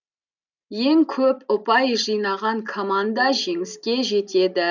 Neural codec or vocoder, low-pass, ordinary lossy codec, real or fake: none; 7.2 kHz; none; real